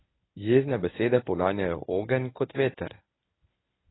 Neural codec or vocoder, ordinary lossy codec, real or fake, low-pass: codec, 24 kHz, 0.9 kbps, WavTokenizer, medium speech release version 1; AAC, 16 kbps; fake; 7.2 kHz